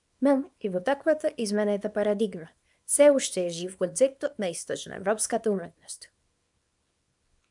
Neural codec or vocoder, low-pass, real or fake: codec, 24 kHz, 0.9 kbps, WavTokenizer, small release; 10.8 kHz; fake